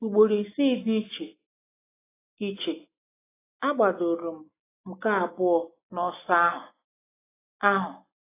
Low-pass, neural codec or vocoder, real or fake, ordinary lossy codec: 3.6 kHz; none; real; AAC, 16 kbps